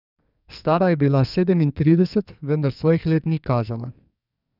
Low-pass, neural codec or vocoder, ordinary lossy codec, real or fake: 5.4 kHz; codec, 44.1 kHz, 2.6 kbps, SNAC; none; fake